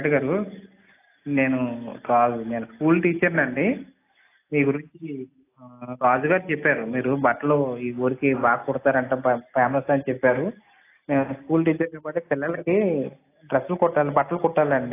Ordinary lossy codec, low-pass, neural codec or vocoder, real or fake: AAC, 24 kbps; 3.6 kHz; none; real